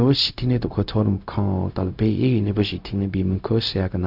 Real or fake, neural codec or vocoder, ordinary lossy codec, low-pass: fake; codec, 16 kHz, 0.4 kbps, LongCat-Audio-Codec; AAC, 48 kbps; 5.4 kHz